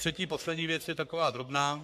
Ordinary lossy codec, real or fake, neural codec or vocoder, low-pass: AAC, 96 kbps; fake; codec, 44.1 kHz, 3.4 kbps, Pupu-Codec; 14.4 kHz